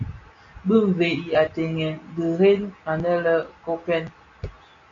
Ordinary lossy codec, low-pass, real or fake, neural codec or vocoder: MP3, 64 kbps; 7.2 kHz; real; none